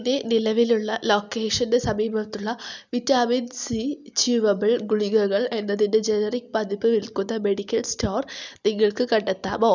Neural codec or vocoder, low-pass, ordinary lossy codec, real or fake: none; 7.2 kHz; none; real